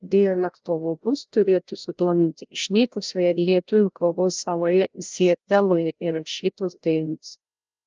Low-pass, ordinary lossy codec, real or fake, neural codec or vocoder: 7.2 kHz; Opus, 24 kbps; fake; codec, 16 kHz, 0.5 kbps, FreqCodec, larger model